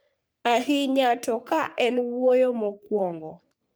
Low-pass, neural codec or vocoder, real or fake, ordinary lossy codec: none; codec, 44.1 kHz, 3.4 kbps, Pupu-Codec; fake; none